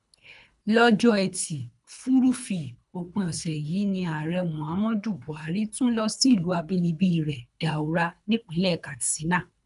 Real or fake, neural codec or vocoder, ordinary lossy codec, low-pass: fake; codec, 24 kHz, 3 kbps, HILCodec; none; 10.8 kHz